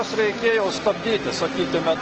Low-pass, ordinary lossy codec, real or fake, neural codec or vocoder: 7.2 kHz; Opus, 16 kbps; real; none